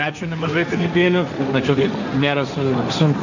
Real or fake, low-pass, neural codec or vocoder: fake; 7.2 kHz; codec, 16 kHz, 1.1 kbps, Voila-Tokenizer